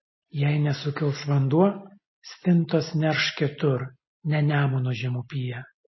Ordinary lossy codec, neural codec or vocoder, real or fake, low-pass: MP3, 24 kbps; none; real; 7.2 kHz